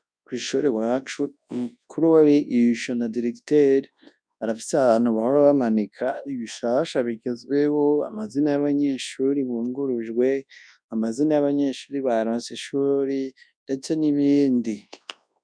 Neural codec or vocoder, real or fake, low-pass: codec, 24 kHz, 0.9 kbps, WavTokenizer, large speech release; fake; 9.9 kHz